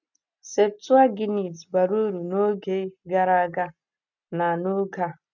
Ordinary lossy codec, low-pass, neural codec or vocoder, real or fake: none; 7.2 kHz; none; real